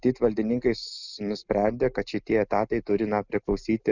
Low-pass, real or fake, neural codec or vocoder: 7.2 kHz; real; none